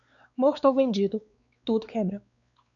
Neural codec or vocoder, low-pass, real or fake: codec, 16 kHz, 4 kbps, X-Codec, WavLM features, trained on Multilingual LibriSpeech; 7.2 kHz; fake